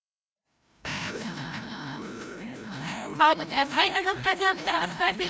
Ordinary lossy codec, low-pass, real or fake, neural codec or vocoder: none; none; fake; codec, 16 kHz, 0.5 kbps, FreqCodec, larger model